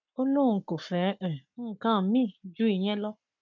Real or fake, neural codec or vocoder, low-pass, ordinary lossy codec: fake; codec, 44.1 kHz, 7.8 kbps, Pupu-Codec; 7.2 kHz; none